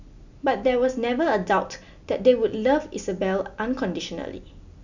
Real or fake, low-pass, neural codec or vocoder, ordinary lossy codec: real; 7.2 kHz; none; none